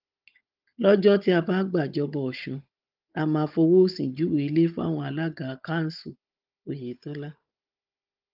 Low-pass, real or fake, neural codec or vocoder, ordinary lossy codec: 5.4 kHz; fake; codec, 16 kHz, 16 kbps, FunCodec, trained on Chinese and English, 50 frames a second; Opus, 32 kbps